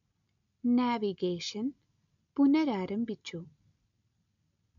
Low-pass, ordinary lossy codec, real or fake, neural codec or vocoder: 7.2 kHz; none; real; none